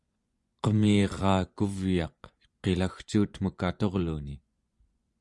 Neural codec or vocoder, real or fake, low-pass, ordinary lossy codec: vocoder, 24 kHz, 100 mel bands, Vocos; fake; 10.8 kHz; Opus, 64 kbps